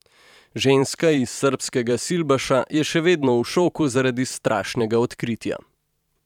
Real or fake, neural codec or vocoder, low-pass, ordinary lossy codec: fake; vocoder, 44.1 kHz, 128 mel bands every 512 samples, BigVGAN v2; 19.8 kHz; none